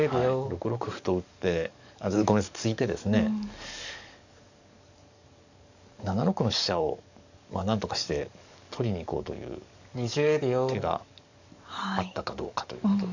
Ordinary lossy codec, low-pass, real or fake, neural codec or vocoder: none; 7.2 kHz; fake; codec, 44.1 kHz, 7.8 kbps, DAC